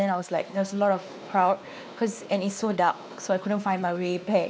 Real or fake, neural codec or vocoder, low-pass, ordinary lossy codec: fake; codec, 16 kHz, 2 kbps, X-Codec, WavLM features, trained on Multilingual LibriSpeech; none; none